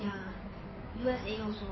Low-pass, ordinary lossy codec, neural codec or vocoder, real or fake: 7.2 kHz; MP3, 24 kbps; codec, 16 kHz in and 24 kHz out, 2.2 kbps, FireRedTTS-2 codec; fake